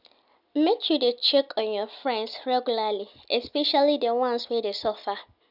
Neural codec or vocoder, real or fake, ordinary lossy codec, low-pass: none; real; none; 5.4 kHz